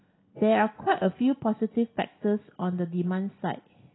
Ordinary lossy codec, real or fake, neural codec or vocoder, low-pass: AAC, 16 kbps; real; none; 7.2 kHz